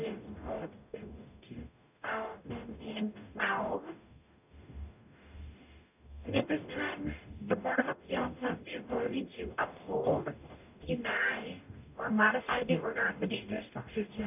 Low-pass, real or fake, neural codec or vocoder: 3.6 kHz; fake; codec, 44.1 kHz, 0.9 kbps, DAC